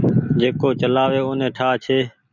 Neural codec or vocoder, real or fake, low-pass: none; real; 7.2 kHz